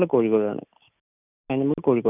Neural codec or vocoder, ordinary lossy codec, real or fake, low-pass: none; none; real; 3.6 kHz